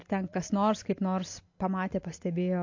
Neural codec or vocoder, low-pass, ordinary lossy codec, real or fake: none; 7.2 kHz; MP3, 48 kbps; real